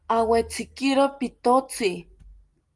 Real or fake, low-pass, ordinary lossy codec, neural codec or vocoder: real; 10.8 kHz; Opus, 24 kbps; none